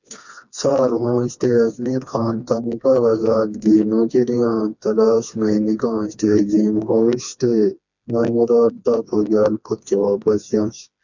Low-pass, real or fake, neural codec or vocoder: 7.2 kHz; fake; codec, 16 kHz, 2 kbps, FreqCodec, smaller model